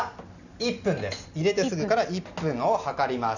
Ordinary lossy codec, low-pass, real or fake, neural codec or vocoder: none; 7.2 kHz; real; none